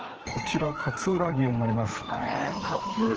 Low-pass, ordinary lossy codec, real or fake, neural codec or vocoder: 7.2 kHz; Opus, 16 kbps; fake; codec, 16 kHz, 4 kbps, FreqCodec, larger model